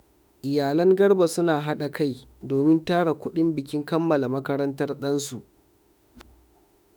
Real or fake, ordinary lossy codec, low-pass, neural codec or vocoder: fake; none; none; autoencoder, 48 kHz, 32 numbers a frame, DAC-VAE, trained on Japanese speech